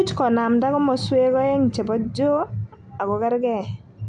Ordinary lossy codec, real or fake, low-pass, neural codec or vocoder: none; real; 10.8 kHz; none